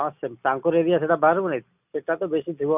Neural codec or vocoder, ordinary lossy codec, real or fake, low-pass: none; none; real; 3.6 kHz